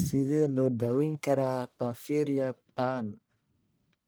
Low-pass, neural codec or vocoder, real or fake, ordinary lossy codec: none; codec, 44.1 kHz, 1.7 kbps, Pupu-Codec; fake; none